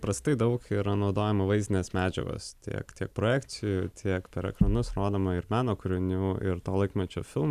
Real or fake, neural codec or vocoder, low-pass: real; none; 14.4 kHz